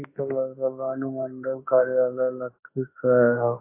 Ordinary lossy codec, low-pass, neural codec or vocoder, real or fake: AAC, 24 kbps; 3.6 kHz; autoencoder, 48 kHz, 32 numbers a frame, DAC-VAE, trained on Japanese speech; fake